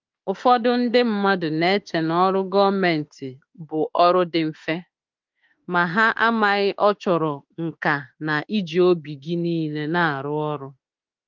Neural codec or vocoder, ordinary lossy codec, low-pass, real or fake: autoencoder, 48 kHz, 32 numbers a frame, DAC-VAE, trained on Japanese speech; Opus, 24 kbps; 7.2 kHz; fake